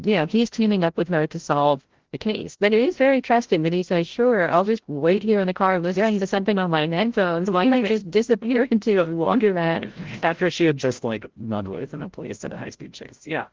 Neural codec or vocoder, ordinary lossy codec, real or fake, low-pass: codec, 16 kHz, 0.5 kbps, FreqCodec, larger model; Opus, 16 kbps; fake; 7.2 kHz